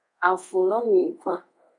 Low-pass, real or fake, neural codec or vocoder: 10.8 kHz; fake; codec, 24 kHz, 0.5 kbps, DualCodec